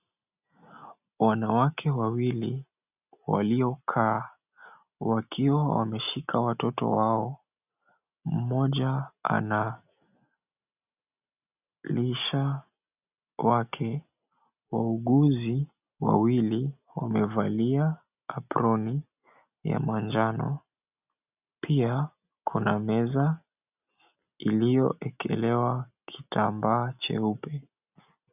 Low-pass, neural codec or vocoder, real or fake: 3.6 kHz; none; real